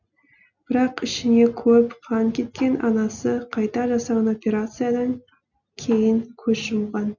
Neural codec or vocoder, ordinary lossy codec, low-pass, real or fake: none; none; 7.2 kHz; real